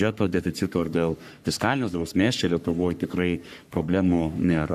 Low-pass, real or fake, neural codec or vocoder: 14.4 kHz; fake; codec, 44.1 kHz, 3.4 kbps, Pupu-Codec